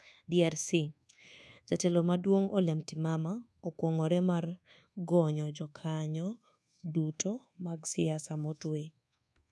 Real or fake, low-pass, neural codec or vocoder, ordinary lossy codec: fake; none; codec, 24 kHz, 1.2 kbps, DualCodec; none